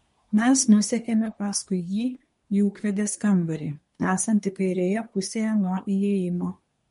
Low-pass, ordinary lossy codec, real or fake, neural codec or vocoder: 10.8 kHz; MP3, 48 kbps; fake; codec, 24 kHz, 1 kbps, SNAC